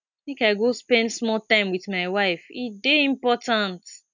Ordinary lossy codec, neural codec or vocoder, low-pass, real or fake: none; none; 7.2 kHz; real